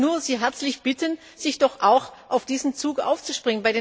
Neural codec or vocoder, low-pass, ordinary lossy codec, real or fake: none; none; none; real